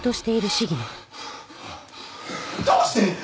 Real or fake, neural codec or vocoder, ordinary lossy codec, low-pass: real; none; none; none